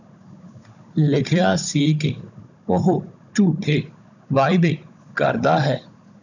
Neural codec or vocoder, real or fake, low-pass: codec, 16 kHz, 16 kbps, FunCodec, trained on Chinese and English, 50 frames a second; fake; 7.2 kHz